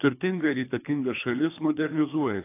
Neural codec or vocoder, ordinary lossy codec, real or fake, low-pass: codec, 24 kHz, 3 kbps, HILCodec; AAC, 24 kbps; fake; 3.6 kHz